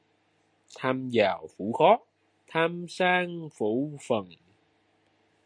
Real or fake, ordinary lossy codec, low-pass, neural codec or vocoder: real; MP3, 48 kbps; 9.9 kHz; none